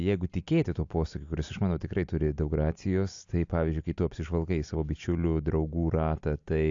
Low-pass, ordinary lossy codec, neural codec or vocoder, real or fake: 7.2 kHz; AAC, 64 kbps; none; real